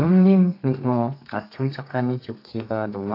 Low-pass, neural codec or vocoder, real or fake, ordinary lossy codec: 5.4 kHz; codec, 32 kHz, 1.9 kbps, SNAC; fake; AAC, 32 kbps